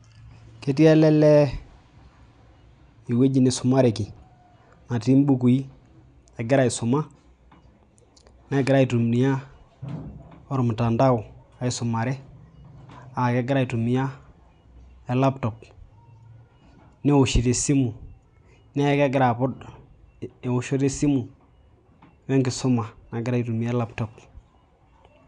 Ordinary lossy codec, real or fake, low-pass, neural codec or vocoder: none; real; 9.9 kHz; none